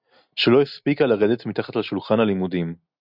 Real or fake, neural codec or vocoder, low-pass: real; none; 5.4 kHz